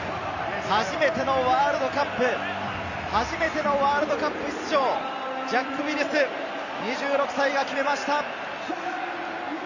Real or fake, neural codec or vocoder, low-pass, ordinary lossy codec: real; none; 7.2 kHz; none